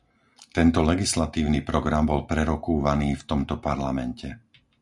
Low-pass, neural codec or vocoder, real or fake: 10.8 kHz; none; real